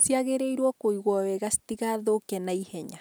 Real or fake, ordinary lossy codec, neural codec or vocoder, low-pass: real; none; none; none